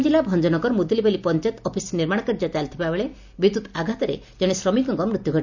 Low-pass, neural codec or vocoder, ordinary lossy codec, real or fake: 7.2 kHz; none; none; real